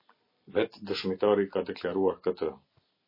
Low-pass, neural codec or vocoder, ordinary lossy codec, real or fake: 5.4 kHz; none; MP3, 24 kbps; real